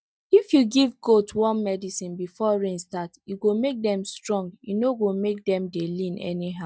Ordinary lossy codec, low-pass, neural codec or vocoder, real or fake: none; none; none; real